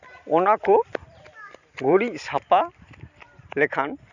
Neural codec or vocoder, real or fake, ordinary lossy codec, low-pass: vocoder, 44.1 kHz, 128 mel bands every 256 samples, BigVGAN v2; fake; none; 7.2 kHz